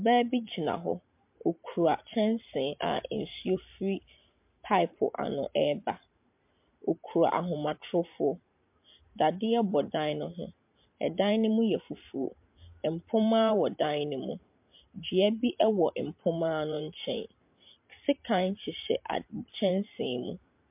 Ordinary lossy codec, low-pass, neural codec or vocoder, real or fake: MP3, 32 kbps; 3.6 kHz; vocoder, 44.1 kHz, 128 mel bands every 512 samples, BigVGAN v2; fake